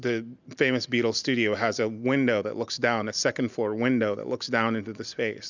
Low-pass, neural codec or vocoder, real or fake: 7.2 kHz; none; real